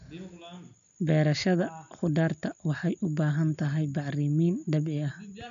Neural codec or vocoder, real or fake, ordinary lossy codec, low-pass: none; real; AAC, 64 kbps; 7.2 kHz